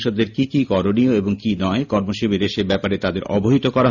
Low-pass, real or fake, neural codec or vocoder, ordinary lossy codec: 7.2 kHz; real; none; none